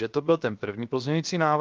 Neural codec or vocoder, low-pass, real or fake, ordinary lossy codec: codec, 16 kHz, 0.7 kbps, FocalCodec; 7.2 kHz; fake; Opus, 32 kbps